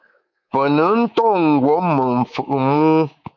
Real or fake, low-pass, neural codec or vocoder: fake; 7.2 kHz; codec, 24 kHz, 3.1 kbps, DualCodec